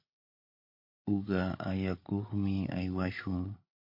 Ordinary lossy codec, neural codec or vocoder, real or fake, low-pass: MP3, 32 kbps; codec, 16 kHz, 8 kbps, FreqCodec, larger model; fake; 5.4 kHz